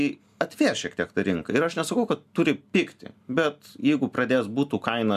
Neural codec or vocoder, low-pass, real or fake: none; 14.4 kHz; real